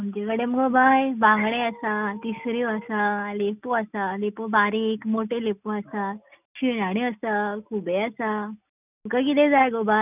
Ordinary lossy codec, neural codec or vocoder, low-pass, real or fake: none; none; 3.6 kHz; real